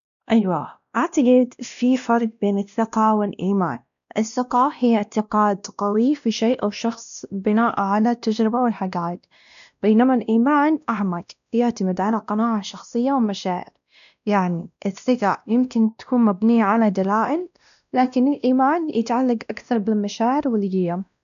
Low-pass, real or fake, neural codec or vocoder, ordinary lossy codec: 7.2 kHz; fake; codec, 16 kHz, 1 kbps, X-Codec, WavLM features, trained on Multilingual LibriSpeech; none